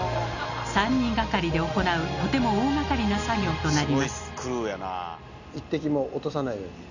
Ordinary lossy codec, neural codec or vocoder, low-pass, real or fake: none; none; 7.2 kHz; real